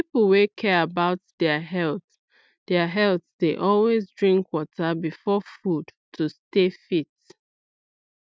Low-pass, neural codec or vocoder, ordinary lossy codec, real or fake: none; none; none; real